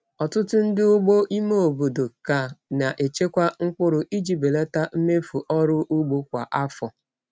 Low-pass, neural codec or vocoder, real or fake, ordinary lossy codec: none; none; real; none